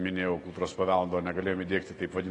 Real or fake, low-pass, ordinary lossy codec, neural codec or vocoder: real; 10.8 kHz; AAC, 32 kbps; none